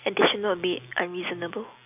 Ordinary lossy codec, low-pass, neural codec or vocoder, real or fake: none; 3.6 kHz; none; real